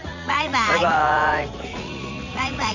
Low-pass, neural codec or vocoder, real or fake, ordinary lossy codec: 7.2 kHz; vocoder, 44.1 kHz, 80 mel bands, Vocos; fake; none